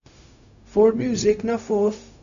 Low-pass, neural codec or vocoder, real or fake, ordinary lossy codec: 7.2 kHz; codec, 16 kHz, 0.4 kbps, LongCat-Audio-Codec; fake; MP3, 96 kbps